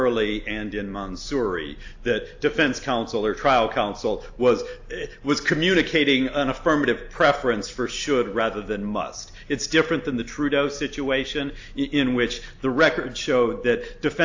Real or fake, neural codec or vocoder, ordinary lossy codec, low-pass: real; none; AAC, 48 kbps; 7.2 kHz